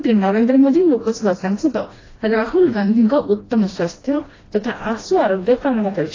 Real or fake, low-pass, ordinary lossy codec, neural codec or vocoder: fake; 7.2 kHz; AAC, 32 kbps; codec, 16 kHz, 1 kbps, FreqCodec, smaller model